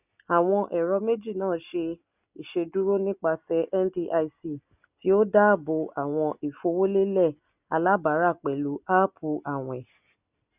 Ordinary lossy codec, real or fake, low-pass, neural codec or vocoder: none; real; 3.6 kHz; none